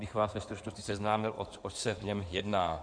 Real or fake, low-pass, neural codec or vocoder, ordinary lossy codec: fake; 9.9 kHz; codec, 16 kHz in and 24 kHz out, 2.2 kbps, FireRedTTS-2 codec; AAC, 64 kbps